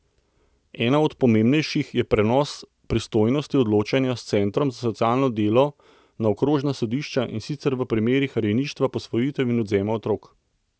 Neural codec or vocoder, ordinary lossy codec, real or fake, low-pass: none; none; real; none